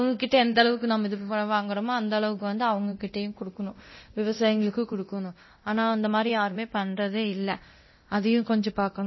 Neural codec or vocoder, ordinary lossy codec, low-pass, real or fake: codec, 24 kHz, 0.9 kbps, DualCodec; MP3, 24 kbps; 7.2 kHz; fake